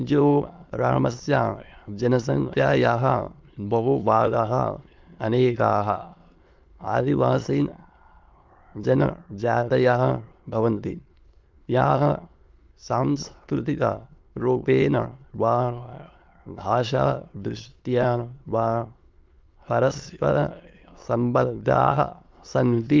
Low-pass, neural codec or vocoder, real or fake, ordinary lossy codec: 7.2 kHz; autoencoder, 22.05 kHz, a latent of 192 numbers a frame, VITS, trained on many speakers; fake; Opus, 32 kbps